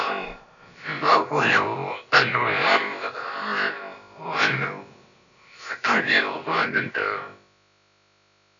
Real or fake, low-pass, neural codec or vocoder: fake; 7.2 kHz; codec, 16 kHz, about 1 kbps, DyCAST, with the encoder's durations